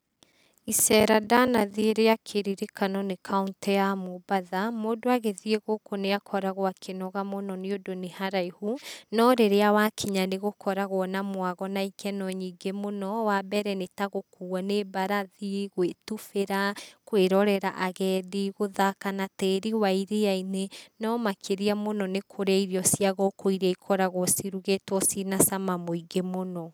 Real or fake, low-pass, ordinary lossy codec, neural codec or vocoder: real; none; none; none